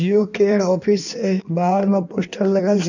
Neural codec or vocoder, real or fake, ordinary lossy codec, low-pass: codec, 16 kHz in and 24 kHz out, 1.1 kbps, FireRedTTS-2 codec; fake; none; 7.2 kHz